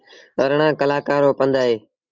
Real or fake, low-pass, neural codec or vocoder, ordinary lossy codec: real; 7.2 kHz; none; Opus, 32 kbps